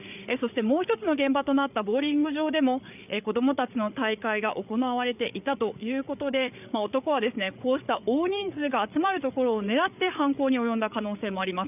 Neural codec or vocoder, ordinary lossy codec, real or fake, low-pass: codec, 16 kHz, 8 kbps, FreqCodec, larger model; none; fake; 3.6 kHz